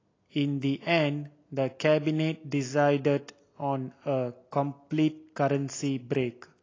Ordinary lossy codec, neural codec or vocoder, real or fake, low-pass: AAC, 32 kbps; none; real; 7.2 kHz